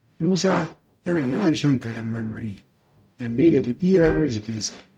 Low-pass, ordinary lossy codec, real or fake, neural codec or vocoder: 19.8 kHz; none; fake; codec, 44.1 kHz, 0.9 kbps, DAC